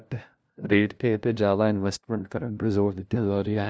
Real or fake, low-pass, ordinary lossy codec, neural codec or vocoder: fake; none; none; codec, 16 kHz, 0.5 kbps, FunCodec, trained on LibriTTS, 25 frames a second